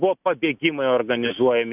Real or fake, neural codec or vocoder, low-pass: real; none; 3.6 kHz